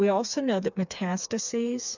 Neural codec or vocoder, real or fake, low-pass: codec, 16 kHz, 4 kbps, FreqCodec, smaller model; fake; 7.2 kHz